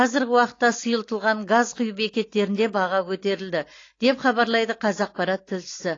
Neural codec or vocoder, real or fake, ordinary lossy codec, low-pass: none; real; AAC, 32 kbps; 7.2 kHz